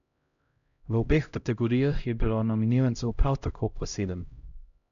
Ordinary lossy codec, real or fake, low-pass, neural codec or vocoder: none; fake; 7.2 kHz; codec, 16 kHz, 0.5 kbps, X-Codec, HuBERT features, trained on LibriSpeech